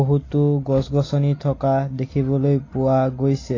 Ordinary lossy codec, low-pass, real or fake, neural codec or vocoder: AAC, 32 kbps; 7.2 kHz; real; none